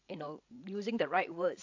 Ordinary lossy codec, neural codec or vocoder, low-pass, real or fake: AAC, 48 kbps; codec, 16 kHz, 16 kbps, FreqCodec, larger model; 7.2 kHz; fake